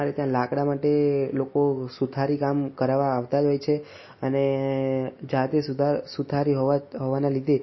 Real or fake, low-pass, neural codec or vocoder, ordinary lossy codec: real; 7.2 kHz; none; MP3, 24 kbps